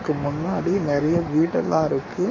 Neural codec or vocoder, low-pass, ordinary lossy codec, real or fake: codec, 44.1 kHz, 7.8 kbps, Pupu-Codec; 7.2 kHz; MP3, 32 kbps; fake